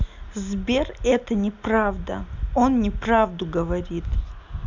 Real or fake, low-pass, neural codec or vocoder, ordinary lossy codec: real; 7.2 kHz; none; none